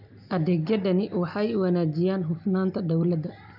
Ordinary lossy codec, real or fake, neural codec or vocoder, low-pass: none; real; none; 5.4 kHz